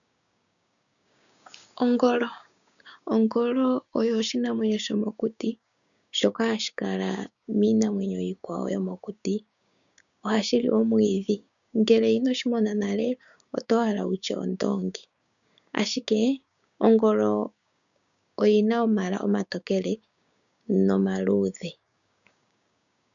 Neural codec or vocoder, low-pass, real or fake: codec, 16 kHz, 6 kbps, DAC; 7.2 kHz; fake